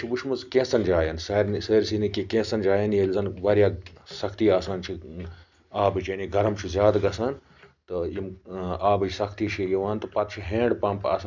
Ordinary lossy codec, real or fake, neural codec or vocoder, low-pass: none; real; none; 7.2 kHz